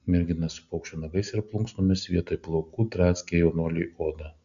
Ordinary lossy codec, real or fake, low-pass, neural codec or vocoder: AAC, 96 kbps; real; 7.2 kHz; none